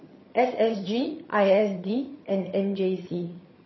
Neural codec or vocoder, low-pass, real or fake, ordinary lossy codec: vocoder, 22.05 kHz, 80 mel bands, HiFi-GAN; 7.2 kHz; fake; MP3, 24 kbps